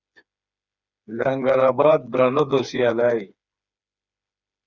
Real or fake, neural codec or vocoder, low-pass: fake; codec, 16 kHz, 4 kbps, FreqCodec, smaller model; 7.2 kHz